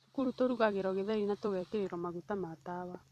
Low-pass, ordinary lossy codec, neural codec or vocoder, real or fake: 14.4 kHz; none; vocoder, 44.1 kHz, 128 mel bands every 256 samples, BigVGAN v2; fake